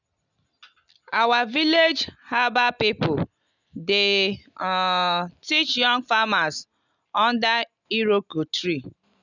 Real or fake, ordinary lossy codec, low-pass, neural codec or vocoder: real; none; 7.2 kHz; none